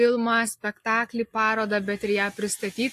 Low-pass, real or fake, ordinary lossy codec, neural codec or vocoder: 14.4 kHz; real; AAC, 64 kbps; none